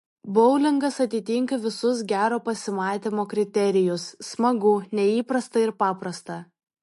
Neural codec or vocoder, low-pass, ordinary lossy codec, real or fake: none; 14.4 kHz; MP3, 48 kbps; real